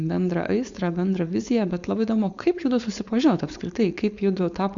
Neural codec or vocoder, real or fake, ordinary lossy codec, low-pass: codec, 16 kHz, 4.8 kbps, FACodec; fake; Opus, 64 kbps; 7.2 kHz